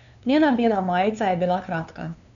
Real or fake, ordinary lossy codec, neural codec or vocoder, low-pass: fake; none; codec, 16 kHz, 2 kbps, FunCodec, trained on LibriTTS, 25 frames a second; 7.2 kHz